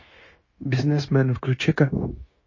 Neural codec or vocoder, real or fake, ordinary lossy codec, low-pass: codec, 16 kHz, 0.9 kbps, LongCat-Audio-Codec; fake; MP3, 32 kbps; 7.2 kHz